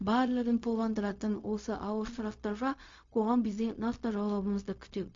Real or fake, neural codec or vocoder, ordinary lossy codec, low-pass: fake; codec, 16 kHz, 0.4 kbps, LongCat-Audio-Codec; MP3, 48 kbps; 7.2 kHz